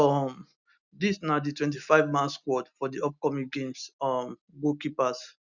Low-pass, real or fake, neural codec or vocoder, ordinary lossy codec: 7.2 kHz; real; none; none